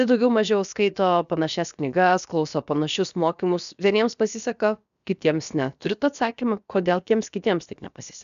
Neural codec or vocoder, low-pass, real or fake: codec, 16 kHz, about 1 kbps, DyCAST, with the encoder's durations; 7.2 kHz; fake